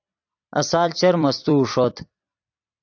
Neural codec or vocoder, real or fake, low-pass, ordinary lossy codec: vocoder, 44.1 kHz, 128 mel bands every 256 samples, BigVGAN v2; fake; 7.2 kHz; AAC, 48 kbps